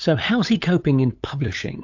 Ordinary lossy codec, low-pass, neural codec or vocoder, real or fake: AAC, 48 kbps; 7.2 kHz; codec, 16 kHz, 8 kbps, FunCodec, trained on LibriTTS, 25 frames a second; fake